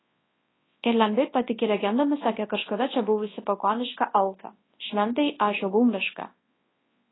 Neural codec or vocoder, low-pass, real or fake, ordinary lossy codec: codec, 24 kHz, 0.9 kbps, WavTokenizer, large speech release; 7.2 kHz; fake; AAC, 16 kbps